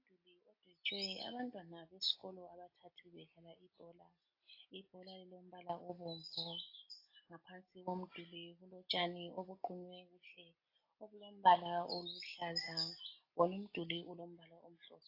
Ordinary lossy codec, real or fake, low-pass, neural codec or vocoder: AAC, 32 kbps; real; 5.4 kHz; none